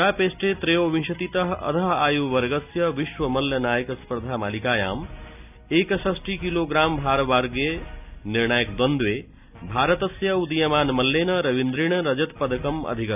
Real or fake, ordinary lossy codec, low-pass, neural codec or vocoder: real; none; 3.6 kHz; none